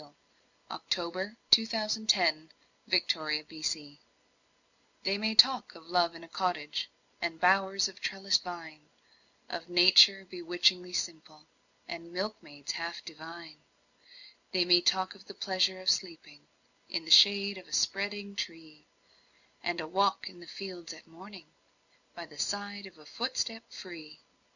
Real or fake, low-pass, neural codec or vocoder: real; 7.2 kHz; none